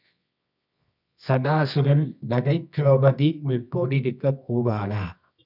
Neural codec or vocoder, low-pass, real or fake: codec, 24 kHz, 0.9 kbps, WavTokenizer, medium music audio release; 5.4 kHz; fake